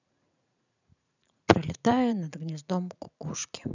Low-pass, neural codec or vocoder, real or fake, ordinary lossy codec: 7.2 kHz; vocoder, 44.1 kHz, 80 mel bands, Vocos; fake; none